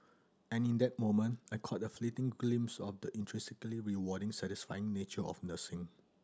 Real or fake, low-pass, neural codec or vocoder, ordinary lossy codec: real; none; none; none